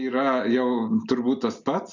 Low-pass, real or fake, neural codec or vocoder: 7.2 kHz; real; none